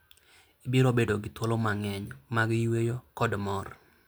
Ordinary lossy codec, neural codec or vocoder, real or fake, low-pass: none; none; real; none